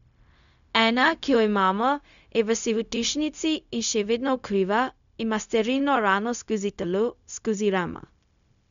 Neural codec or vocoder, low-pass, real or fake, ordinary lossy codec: codec, 16 kHz, 0.4 kbps, LongCat-Audio-Codec; 7.2 kHz; fake; none